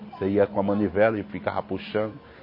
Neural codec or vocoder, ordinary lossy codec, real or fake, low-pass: none; MP3, 24 kbps; real; 5.4 kHz